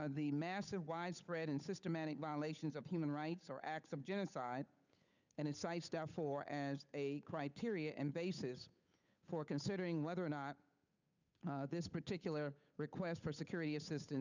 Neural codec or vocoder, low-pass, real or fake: codec, 16 kHz, 8 kbps, FunCodec, trained on Chinese and English, 25 frames a second; 7.2 kHz; fake